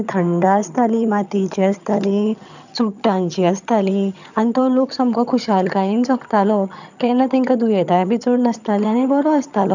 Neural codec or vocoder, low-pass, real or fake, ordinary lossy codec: vocoder, 22.05 kHz, 80 mel bands, HiFi-GAN; 7.2 kHz; fake; none